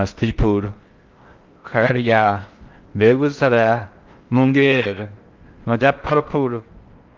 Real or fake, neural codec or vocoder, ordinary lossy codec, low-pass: fake; codec, 16 kHz in and 24 kHz out, 0.6 kbps, FocalCodec, streaming, 4096 codes; Opus, 32 kbps; 7.2 kHz